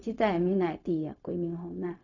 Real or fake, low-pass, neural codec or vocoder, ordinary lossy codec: fake; 7.2 kHz; codec, 16 kHz, 0.4 kbps, LongCat-Audio-Codec; none